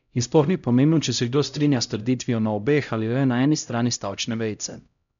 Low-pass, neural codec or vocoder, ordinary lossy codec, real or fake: 7.2 kHz; codec, 16 kHz, 0.5 kbps, X-Codec, HuBERT features, trained on LibriSpeech; none; fake